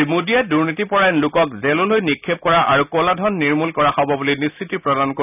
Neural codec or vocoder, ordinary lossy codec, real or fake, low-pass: none; none; real; 3.6 kHz